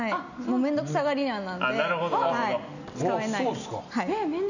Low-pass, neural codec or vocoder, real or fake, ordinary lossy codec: 7.2 kHz; none; real; none